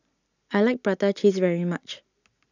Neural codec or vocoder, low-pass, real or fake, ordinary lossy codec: none; 7.2 kHz; real; none